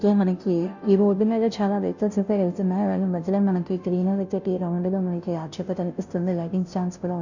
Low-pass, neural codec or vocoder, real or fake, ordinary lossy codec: 7.2 kHz; codec, 16 kHz, 0.5 kbps, FunCodec, trained on Chinese and English, 25 frames a second; fake; none